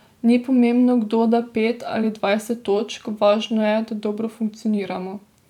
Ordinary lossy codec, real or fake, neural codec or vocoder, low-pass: none; fake; vocoder, 44.1 kHz, 128 mel bands every 512 samples, BigVGAN v2; 19.8 kHz